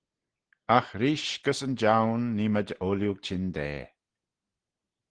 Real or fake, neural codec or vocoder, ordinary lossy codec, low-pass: real; none; Opus, 16 kbps; 9.9 kHz